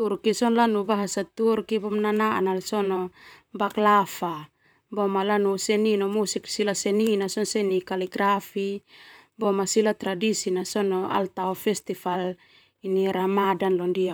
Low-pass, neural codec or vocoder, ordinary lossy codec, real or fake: none; vocoder, 44.1 kHz, 128 mel bands, Pupu-Vocoder; none; fake